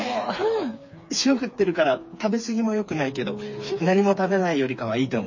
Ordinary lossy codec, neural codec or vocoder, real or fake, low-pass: MP3, 32 kbps; codec, 16 kHz, 4 kbps, FreqCodec, smaller model; fake; 7.2 kHz